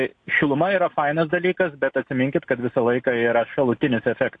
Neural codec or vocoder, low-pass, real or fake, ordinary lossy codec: none; 9.9 kHz; real; AAC, 48 kbps